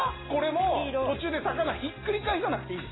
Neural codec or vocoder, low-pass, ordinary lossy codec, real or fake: none; 7.2 kHz; AAC, 16 kbps; real